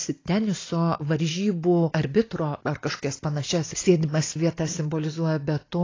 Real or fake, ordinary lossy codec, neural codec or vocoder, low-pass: real; AAC, 32 kbps; none; 7.2 kHz